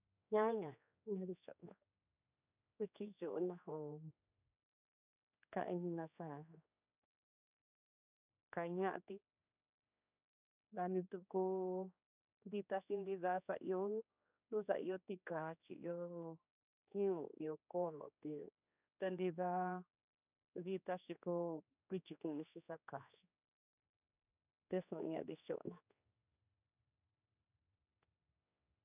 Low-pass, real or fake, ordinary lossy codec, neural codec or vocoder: 3.6 kHz; fake; none; codec, 16 kHz, 2 kbps, X-Codec, HuBERT features, trained on general audio